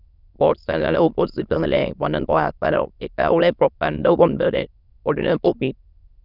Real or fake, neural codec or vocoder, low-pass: fake; autoencoder, 22.05 kHz, a latent of 192 numbers a frame, VITS, trained on many speakers; 5.4 kHz